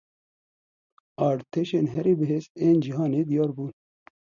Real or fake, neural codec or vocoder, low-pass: real; none; 7.2 kHz